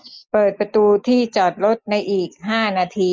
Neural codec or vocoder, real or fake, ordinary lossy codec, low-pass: none; real; none; none